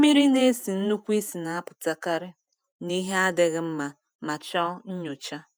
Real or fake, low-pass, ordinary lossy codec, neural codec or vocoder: fake; none; none; vocoder, 48 kHz, 128 mel bands, Vocos